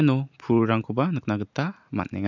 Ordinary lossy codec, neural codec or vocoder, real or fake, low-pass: none; none; real; 7.2 kHz